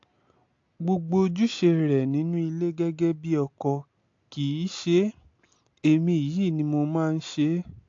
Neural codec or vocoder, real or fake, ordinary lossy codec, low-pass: none; real; AAC, 48 kbps; 7.2 kHz